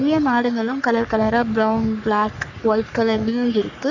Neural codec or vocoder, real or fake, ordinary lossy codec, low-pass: codec, 44.1 kHz, 3.4 kbps, Pupu-Codec; fake; none; 7.2 kHz